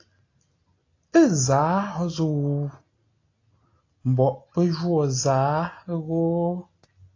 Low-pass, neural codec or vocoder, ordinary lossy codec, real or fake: 7.2 kHz; none; AAC, 32 kbps; real